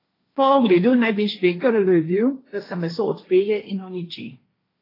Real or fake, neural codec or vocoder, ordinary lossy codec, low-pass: fake; codec, 16 kHz, 1.1 kbps, Voila-Tokenizer; AAC, 24 kbps; 5.4 kHz